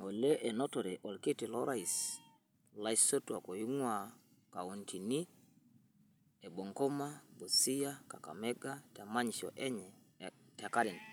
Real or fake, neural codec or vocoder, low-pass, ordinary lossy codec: real; none; none; none